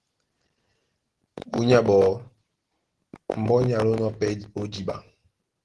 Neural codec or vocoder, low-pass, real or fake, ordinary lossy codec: none; 10.8 kHz; real; Opus, 16 kbps